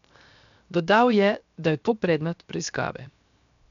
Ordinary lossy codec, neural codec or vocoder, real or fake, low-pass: none; codec, 16 kHz, 0.7 kbps, FocalCodec; fake; 7.2 kHz